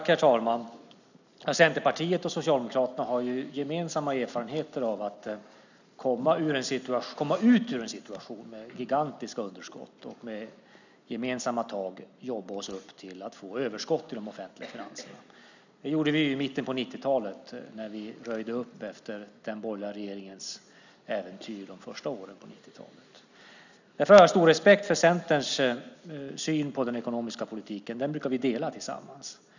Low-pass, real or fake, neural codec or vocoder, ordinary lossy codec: 7.2 kHz; real; none; none